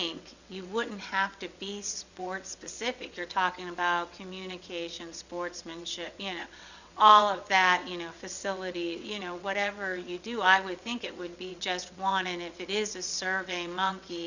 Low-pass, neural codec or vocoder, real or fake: 7.2 kHz; vocoder, 22.05 kHz, 80 mel bands, WaveNeXt; fake